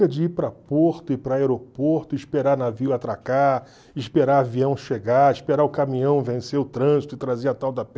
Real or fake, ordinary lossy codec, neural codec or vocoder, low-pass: real; none; none; none